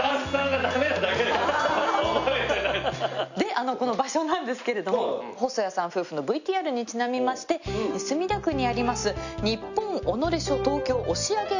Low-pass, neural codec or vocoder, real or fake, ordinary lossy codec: 7.2 kHz; none; real; none